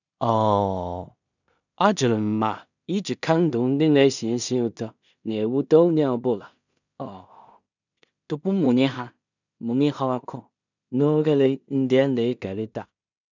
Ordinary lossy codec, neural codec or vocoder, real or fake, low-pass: none; codec, 16 kHz in and 24 kHz out, 0.4 kbps, LongCat-Audio-Codec, two codebook decoder; fake; 7.2 kHz